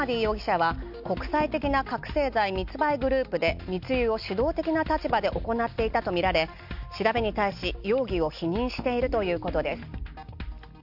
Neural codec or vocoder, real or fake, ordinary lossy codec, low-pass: none; real; none; 5.4 kHz